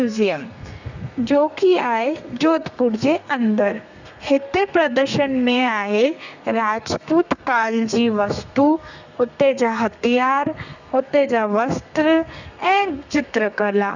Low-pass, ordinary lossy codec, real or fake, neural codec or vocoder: 7.2 kHz; none; fake; codec, 32 kHz, 1.9 kbps, SNAC